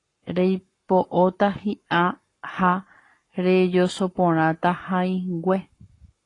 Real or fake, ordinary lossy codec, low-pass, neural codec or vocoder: fake; AAC, 32 kbps; 10.8 kHz; codec, 44.1 kHz, 7.8 kbps, Pupu-Codec